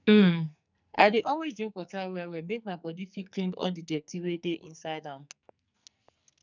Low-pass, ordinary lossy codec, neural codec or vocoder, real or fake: 7.2 kHz; none; codec, 44.1 kHz, 2.6 kbps, SNAC; fake